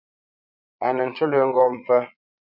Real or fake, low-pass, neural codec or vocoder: fake; 5.4 kHz; vocoder, 22.05 kHz, 80 mel bands, Vocos